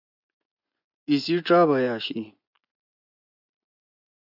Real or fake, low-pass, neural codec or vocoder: fake; 5.4 kHz; vocoder, 24 kHz, 100 mel bands, Vocos